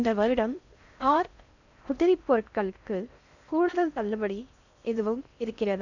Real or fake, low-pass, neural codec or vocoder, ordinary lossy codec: fake; 7.2 kHz; codec, 16 kHz in and 24 kHz out, 0.6 kbps, FocalCodec, streaming, 4096 codes; none